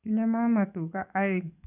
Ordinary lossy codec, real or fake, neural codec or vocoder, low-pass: none; fake; codec, 44.1 kHz, 7.8 kbps, DAC; 3.6 kHz